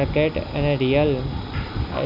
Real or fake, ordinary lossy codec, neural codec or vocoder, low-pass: real; none; none; 5.4 kHz